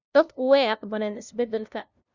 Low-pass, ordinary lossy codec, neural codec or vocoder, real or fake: 7.2 kHz; none; codec, 16 kHz, 0.5 kbps, FunCodec, trained on LibriTTS, 25 frames a second; fake